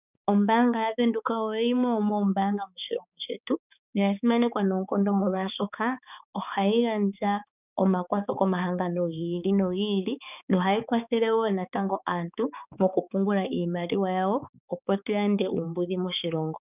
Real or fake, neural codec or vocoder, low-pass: fake; codec, 16 kHz, 4 kbps, X-Codec, HuBERT features, trained on balanced general audio; 3.6 kHz